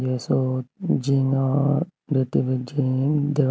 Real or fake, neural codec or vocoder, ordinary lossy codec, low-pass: real; none; none; none